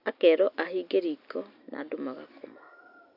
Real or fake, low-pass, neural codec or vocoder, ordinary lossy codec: real; 5.4 kHz; none; none